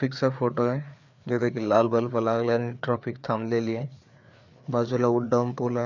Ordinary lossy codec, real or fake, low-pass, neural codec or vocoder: none; fake; 7.2 kHz; codec, 16 kHz, 4 kbps, FreqCodec, larger model